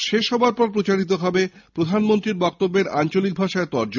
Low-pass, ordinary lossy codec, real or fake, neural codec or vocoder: 7.2 kHz; none; real; none